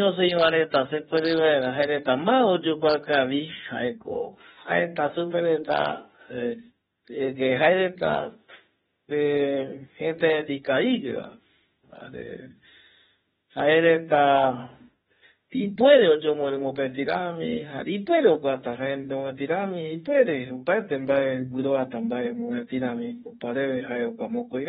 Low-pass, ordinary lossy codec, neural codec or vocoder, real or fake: 19.8 kHz; AAC, 16 kbps; autoencoder, 48 kHz, 32 numbers a frame, DAC-VAE, trained on Japanese speech; fake